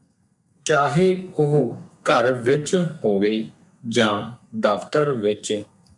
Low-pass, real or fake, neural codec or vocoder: 10.8 kHz; fake; codec, 32 kHz, 1.9 kbps, SNAC